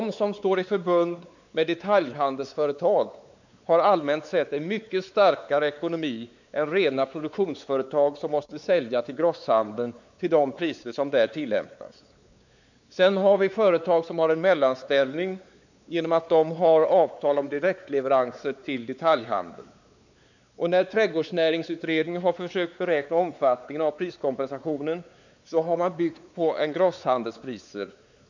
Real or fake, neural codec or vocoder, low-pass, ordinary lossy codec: fake; codec, 16 kHz, 4 kbps, X-Codec, WavLM features, trained on Multilingual LibriSpeech; 7.2 kHz; none